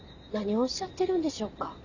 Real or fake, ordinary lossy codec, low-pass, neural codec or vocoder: real; none; 7.2 kHz; none